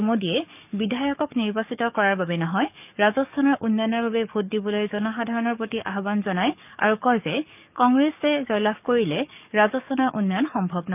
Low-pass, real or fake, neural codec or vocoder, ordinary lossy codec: 3.6 kHz; fake; codec, 44.1 kHz, 7.8 kbps, DAC; none